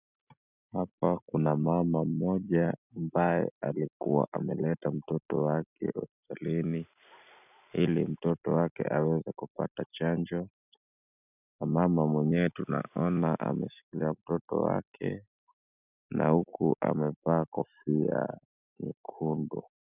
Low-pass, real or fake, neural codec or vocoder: 3.6 kHz; real; none